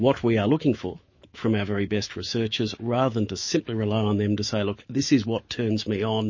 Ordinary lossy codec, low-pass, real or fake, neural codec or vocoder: MP3, 32 kbps; 7.2 kHz; fake; autoencoder, 48 kHz, 128 numbers a frame, DAC-VAE, trained on Japanese speech